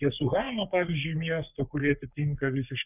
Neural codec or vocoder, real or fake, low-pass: codec, 44.1 kHz, 3.4 kbps, Pupu-Codec; fake; 3.6 kHz